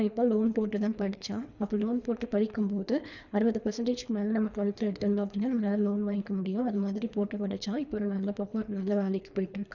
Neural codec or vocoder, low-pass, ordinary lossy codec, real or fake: codec, 24 kHz, 3 kbps, HILCodec; 7.2 kHz; none; fake